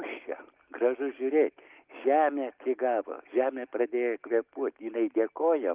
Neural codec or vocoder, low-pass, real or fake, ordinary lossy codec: none; 3.6 kHz; real; Opus, 32 kbps